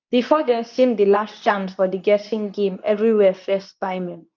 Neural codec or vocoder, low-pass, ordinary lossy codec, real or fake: codec, 24 kHz, 0.9 kbps, WavTokenizer, medium speech release version 2; 7.2 kHz; none; fake